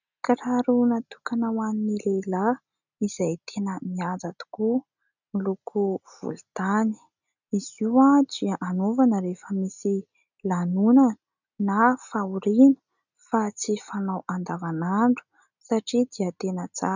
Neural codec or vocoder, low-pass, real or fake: none; 7.2 kHz; real